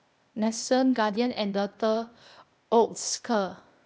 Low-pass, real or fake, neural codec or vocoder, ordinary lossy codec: none; fake; codec, 16 kHz, 0.8 kbps, ZipCodec; none